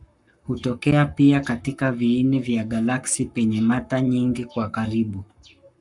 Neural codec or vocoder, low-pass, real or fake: codec, 44.1 kHz, 7.8 kbps, Pupu-Codec; 10.8 kHz; fake